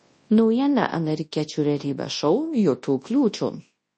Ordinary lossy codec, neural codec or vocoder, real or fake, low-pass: MP3, 32 kbps; codec, 24 kHz, 0.9 kbps, WavTokenizer, large speech release; fake; 10.8 kHz